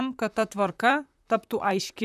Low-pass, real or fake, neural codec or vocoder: 14.4 kHz; fake; vocoder, 44.1 kHz, 128 mel bands, Pupu-Vocoder